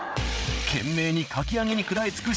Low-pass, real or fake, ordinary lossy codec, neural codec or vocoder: none; fake; none; codec, 16 kHz, 8 kbps, FreqCodec, larger model